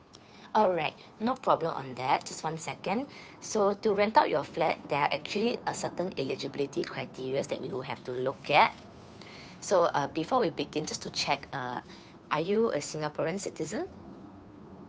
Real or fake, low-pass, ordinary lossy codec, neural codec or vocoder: fake; none; none; codec, 16 kHz, 2 kbps, FunCodec, trained on Chinese and English, 25 frames a second